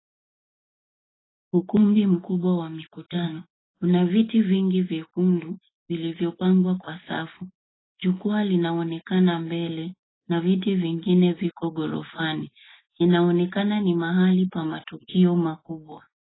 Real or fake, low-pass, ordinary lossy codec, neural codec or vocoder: real; 7.2 kHz; AAC, 16 kbps; none